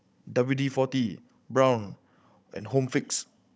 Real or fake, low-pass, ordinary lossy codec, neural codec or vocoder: fake; none; none; codec, 16 kHz, 16 kbps, FunCodec, trained on Chinese and English, 50 frames a second